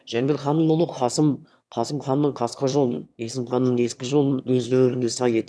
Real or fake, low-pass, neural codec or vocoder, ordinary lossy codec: fake; none; autoencoder, 22.05 kHz, a latent of 192 numbers a frame, VITS, trained on one speaker; none